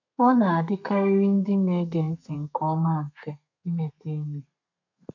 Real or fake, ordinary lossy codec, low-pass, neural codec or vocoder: fake; none; 7.2 kHz; codec, 32 kHz, 1.9 kbps, SNAC